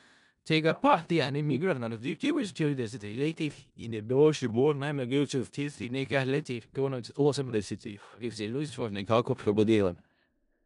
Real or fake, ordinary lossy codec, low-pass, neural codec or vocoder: fake; none; 10.8 kHz; codec, 16 kHz in and 24 kHz out, 0.4 kbps, LongCat-Audio-Codec, four codebook decoder